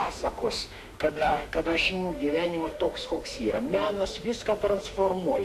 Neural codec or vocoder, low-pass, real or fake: autoencoder, 48 kHz, 32 numbers a frame, DAC-VAE, trained on Japanese speech; 14.4 kHz; fake